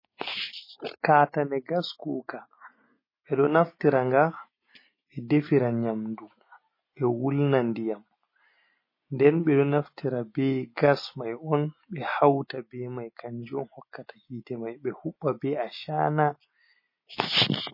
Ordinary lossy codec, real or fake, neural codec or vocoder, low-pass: MP3, 24 kbps; real; none; 5.4 kHz